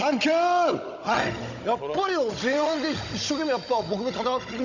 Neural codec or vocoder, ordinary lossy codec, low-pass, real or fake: codec, 16 kHz, 16 kbps, FunCodec, trained on Chinese and English, 50 frames a second; none; 7.2 kHz; fake